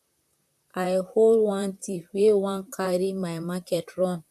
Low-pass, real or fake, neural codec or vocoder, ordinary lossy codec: 14.4 kHz; fake; vocoder, 44.1 kHz, 128 mel bands, Pupu-Vocoder; none